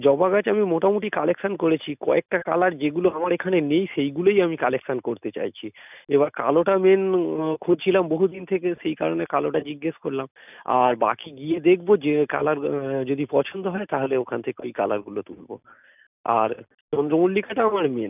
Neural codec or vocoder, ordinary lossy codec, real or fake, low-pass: none; none; real; 3.6 kHz